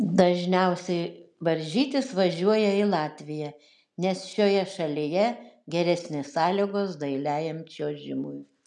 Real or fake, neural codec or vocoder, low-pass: real; none; 10.8 kHz